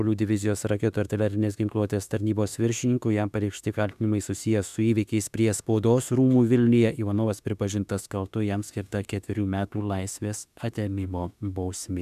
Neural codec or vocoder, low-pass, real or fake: autoencoder, 48 kHz, 32 numbers a frame, DAC-VAE, trained on Japanese speech; 14.4 kHz; fake